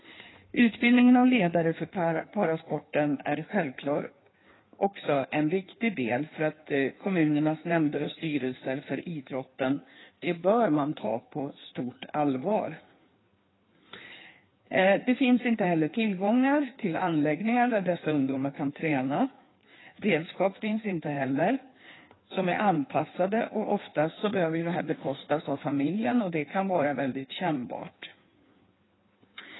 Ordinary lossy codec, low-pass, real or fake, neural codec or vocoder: AAC, 16 kbps; 7.2 kHz; fake; codec, 16 kHz in and 24 kHz out, 1.1 kbps, FireRedTTS-2 codec